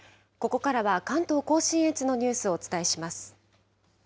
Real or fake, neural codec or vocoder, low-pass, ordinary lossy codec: real; none; none; none